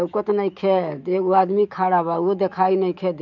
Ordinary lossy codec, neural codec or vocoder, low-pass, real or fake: none; autoencoder, 48 kHz, 128 numbers a frame, DAC-VAE, trained on Japanese speech; 7.2 kHz; fake